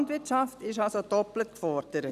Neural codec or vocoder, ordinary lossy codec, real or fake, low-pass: none; none; real; 14.4 kHz